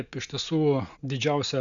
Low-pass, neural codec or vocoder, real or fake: 7.2 kHz; none; real